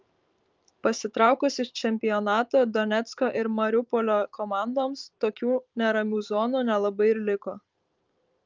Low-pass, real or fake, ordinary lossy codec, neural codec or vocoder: 7.2 kHz; fake; Opus, 24 kbps; vocoder, 44.1 kHz, 128 mel bands every 512 samples, BigVGAN v2